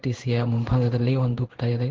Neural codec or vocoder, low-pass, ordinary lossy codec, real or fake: codec, 16 kHz in and 24 kHz out, 1 kbps, XY-Tokenizer; 7.2 kHz; Opus, 16 kbps; fake